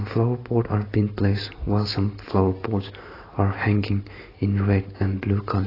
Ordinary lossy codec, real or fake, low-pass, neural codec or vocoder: AAC, 24 kbps; real; 5.4 kHz; none